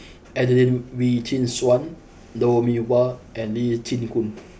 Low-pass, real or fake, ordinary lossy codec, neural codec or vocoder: none; real; none; none